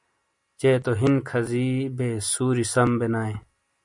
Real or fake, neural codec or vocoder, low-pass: real; none; 10.8 kHz